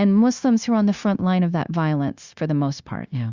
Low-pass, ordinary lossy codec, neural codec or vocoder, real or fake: 7.2 kHz; Opus, 64 kbps; codec, 16 kHz, 0.9 kbps, LongCat-Audio-Codec; fake